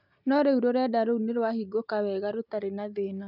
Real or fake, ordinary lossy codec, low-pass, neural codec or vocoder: real; none; 5.4 kHz; none